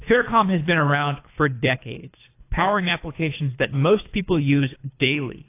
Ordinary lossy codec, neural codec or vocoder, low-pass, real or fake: AAC, 24 kbps; codec, 24 kHz, 3 kbps, HILCodec; 3.6 kHz; fake